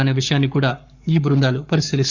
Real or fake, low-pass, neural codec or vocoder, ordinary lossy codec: fake; 7.2 kHz; codec, 44.1 kHz, 7.8 kbps, Pupu-Codec; Opus, 64 kbps